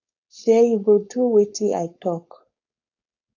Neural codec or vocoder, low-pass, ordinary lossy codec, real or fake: codec, 16 kHz, 4.8 kbps, FACodec; 7.2 kHz; AAC, 48 kbps; fake